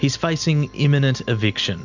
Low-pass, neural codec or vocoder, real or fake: 7.2 kHz; none; real